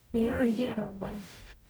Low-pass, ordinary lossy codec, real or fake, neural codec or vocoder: none; none; fake; codec, 44.1 kHz, 0.9 kbps, DAC